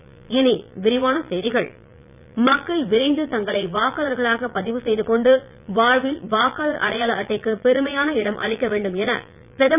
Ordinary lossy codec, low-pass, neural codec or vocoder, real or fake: none; 3.6 kHz; vocoder, 22.05 kHz, 80 mel bands, Vocos; fake